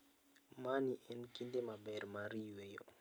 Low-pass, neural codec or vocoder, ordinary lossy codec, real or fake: none; none; none; real